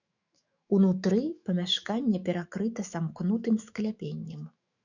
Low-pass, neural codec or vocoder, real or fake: 7.2 kHz; autoencoder, 48 kHz, 128 numbers a frame, DAC-VAE, trained on Japanese speech; fake